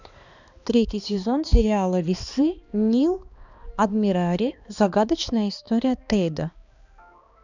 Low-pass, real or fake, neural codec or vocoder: 7.2 kHz; fake; codec, 16 kHz, 2 kbps, X-Codec, HuBERT features, trained on balanced general audio